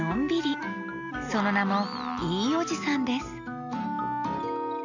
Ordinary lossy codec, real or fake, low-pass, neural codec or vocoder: none; real; 7.2 kHz; none